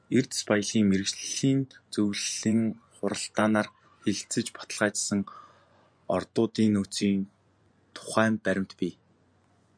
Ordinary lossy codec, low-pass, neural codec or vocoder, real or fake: MP3, 96 kbps; 9.9 kHz; vocoder, 24 kHz, 100 mel bands, Vocos; fake